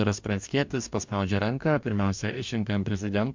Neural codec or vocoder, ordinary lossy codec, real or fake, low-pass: codec, 44.1 kHz, 2.6 kbps, DAC; MP3, 64 kbps; fake; 7.2 kHz